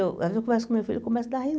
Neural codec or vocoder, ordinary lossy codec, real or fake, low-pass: none; none; real; none